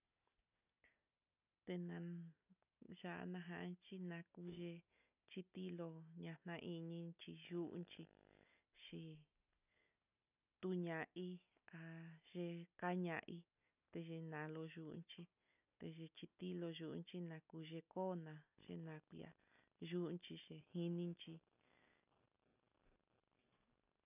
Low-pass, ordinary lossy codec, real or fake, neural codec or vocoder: 3.6 kHz; none; real; none